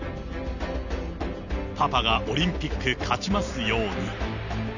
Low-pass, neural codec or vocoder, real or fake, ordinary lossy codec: 7.2 kHz; none; real; none